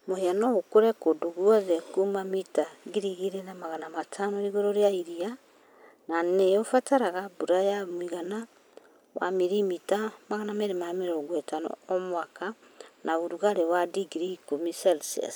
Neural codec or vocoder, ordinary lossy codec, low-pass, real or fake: none; none; none; real